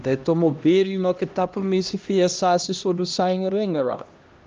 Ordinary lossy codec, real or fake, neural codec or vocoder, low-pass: Opus, 24 kbps; fake; codec, 16 kHz, 1 kbps, X-Codec, HuBERT features, trained on LibriSpeech; 7.2 kHz